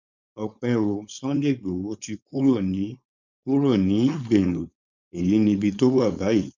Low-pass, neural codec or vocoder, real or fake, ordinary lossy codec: 7.2 kHz; codec, 16 kHz, 4.8 kbps, FACodec; fake; none